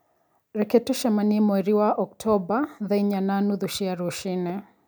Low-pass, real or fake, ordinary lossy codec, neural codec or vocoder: none; real; none; none